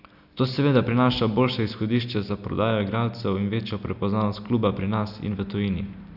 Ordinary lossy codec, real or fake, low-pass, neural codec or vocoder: none; real; 5.4 kHz; none